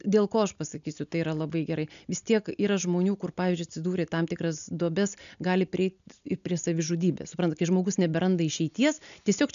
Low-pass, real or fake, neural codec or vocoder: 7.2 kHz; real; none